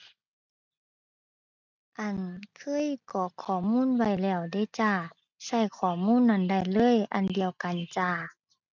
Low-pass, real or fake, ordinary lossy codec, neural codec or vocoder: 7.2 kHz; real; none; none